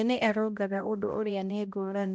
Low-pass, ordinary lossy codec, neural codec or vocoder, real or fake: none; none; codec, 16 kHz, 0.5 kbps, X-Codec, HuBERT features, trained on balanced general audio; fake